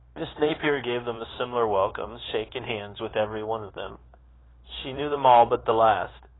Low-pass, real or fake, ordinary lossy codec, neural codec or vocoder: 7.2 kHz; fake; AAC, 16 kbps; codec, 16 kHz, 0.9 kbps, LongCat-Audio-Codec